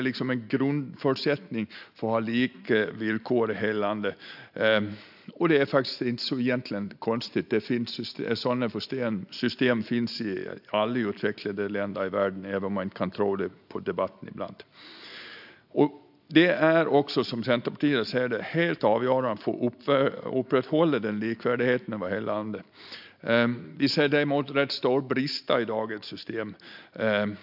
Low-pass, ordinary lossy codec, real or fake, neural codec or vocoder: 5.4 kHz; AAC, 48 kbps; real; none